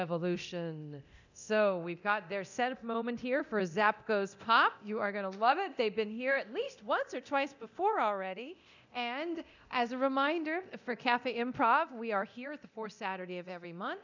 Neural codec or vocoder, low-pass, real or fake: codec, 24 kHz, 0.9 kbps, DualCodec; 7.2 kHz; fake